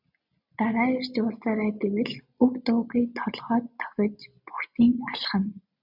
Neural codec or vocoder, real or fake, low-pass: none; real; 5.4 kHz